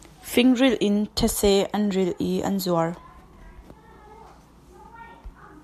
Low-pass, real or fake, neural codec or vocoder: 14.4 kHz; real; none